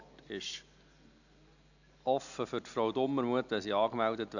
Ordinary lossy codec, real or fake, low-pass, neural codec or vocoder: none; real; 7.2 kHz; none